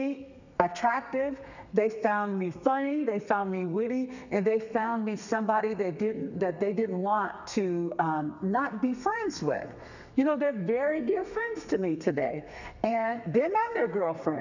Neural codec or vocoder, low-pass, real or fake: codec, 32 kHz, 1.9 kbps, SNAC; 7.2 kHz; fake